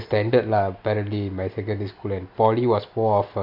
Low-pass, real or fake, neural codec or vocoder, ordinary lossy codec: 5.4 kHz; real; none; AAC, 48 kbps